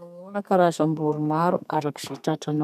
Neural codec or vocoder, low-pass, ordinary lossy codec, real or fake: codec, 32 kHz, 1.9 kbps, SNAC; 14.4 kHz; none; fake